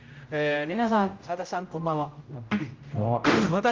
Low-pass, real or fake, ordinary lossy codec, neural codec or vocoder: 7.2 kHz; fake; Opus, 32 kbps; codec, 16 kHz, 0.5 kbps, X-Codec, HuBERT features, trained on general audio